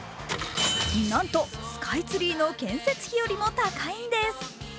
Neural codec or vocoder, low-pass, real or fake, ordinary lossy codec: none; none; real; none